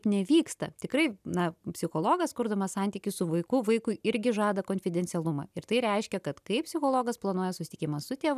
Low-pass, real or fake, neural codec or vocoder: 14.4 kHz; real; none